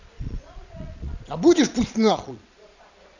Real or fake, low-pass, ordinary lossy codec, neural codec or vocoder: fake; 7.2 kHz; none; vocoder, 22.05 kHz, 80 mel bands, Vocos